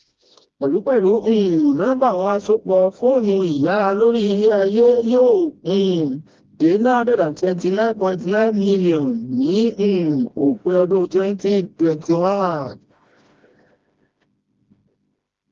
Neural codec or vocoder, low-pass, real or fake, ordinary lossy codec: codec, 16 kHz, 1 kbps, FreqCodec, smaller model; 7.2 kHz; fake; Opus, 32 kbps